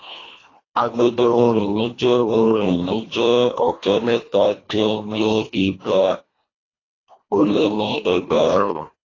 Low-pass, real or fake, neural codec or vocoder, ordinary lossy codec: 7.2 kHz; fake; codec, 24 kHz, 1.5 kbps, HILCodec; AAC, 32 kbps